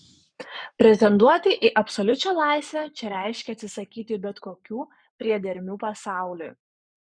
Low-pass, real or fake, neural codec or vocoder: 9.9 kHz; fake; codec, 44.1 kHz, 7.8 kbps, Pupu-Codec